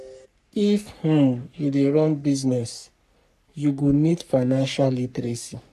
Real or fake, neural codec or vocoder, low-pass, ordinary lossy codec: fake; codec, 44.1 kHz, 3.4 kbps, Pupu-Codec; 14.4 kHz; none